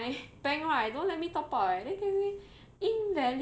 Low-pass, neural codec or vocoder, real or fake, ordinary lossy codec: none; none; real; none